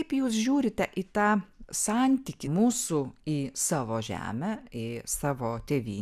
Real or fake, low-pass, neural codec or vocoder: real; 14.4 kHz; none